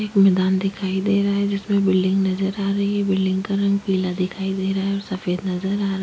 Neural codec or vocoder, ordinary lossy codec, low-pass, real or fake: none; none; none; real